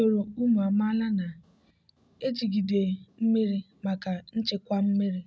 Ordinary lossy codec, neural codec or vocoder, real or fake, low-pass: none; none; real; none